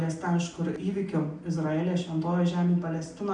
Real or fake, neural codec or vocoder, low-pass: real; none; 10.8 kHz